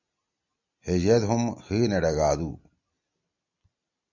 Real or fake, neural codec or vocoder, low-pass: real; none; 7.2 kHz